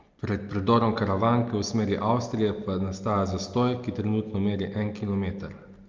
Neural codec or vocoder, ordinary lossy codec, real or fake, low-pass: none; Opus, 24 kbps; real; 7.2 kHz